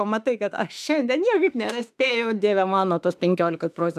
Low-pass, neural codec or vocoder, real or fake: 14.4 kHz; autoencoder, 48 kHz, 32 numbers a frame, DAC-VAE, trained on Japanese speech; fake